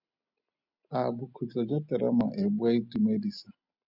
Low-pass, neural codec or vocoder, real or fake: 5.4 kHz; none; real